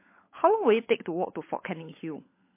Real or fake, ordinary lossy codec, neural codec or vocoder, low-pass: fake; MP3, 24 kbps; codec, 16 kHz, 16 kbps, FunCodec, trained on LibriTTS, 50 frames a second; 3.6 kHz